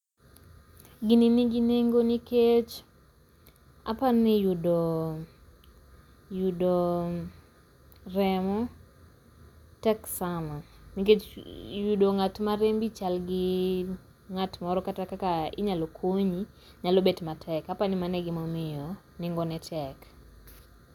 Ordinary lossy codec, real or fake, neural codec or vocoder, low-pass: none; real; none; 19.8 kHz